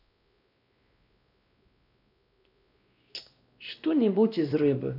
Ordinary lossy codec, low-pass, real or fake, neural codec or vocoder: none; 5.4 kHz; fake; codec, 16 kHz, 1 kbps, X-Codec, WavLM features, trained on Multilingual LibriSpeech